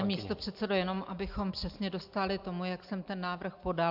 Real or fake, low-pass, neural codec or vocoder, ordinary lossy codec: real; 5.4 kHz; none; AAC, 48 kbps